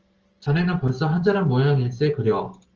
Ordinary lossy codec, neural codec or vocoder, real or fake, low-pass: Opus, 16 kbps; none; real; 7.2 kHz